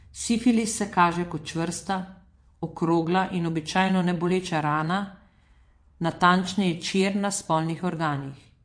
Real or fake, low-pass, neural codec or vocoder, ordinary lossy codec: fake; 9.9 kHz; vocoder, 22.05 kHz, 80 mel bands, WaveNeXt; MP3, 48 kbps